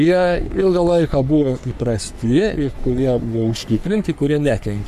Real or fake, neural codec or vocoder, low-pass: fake; codec, 44.1 kHz, 3.4 kbps, Pupu-Codec; 14.4 kHz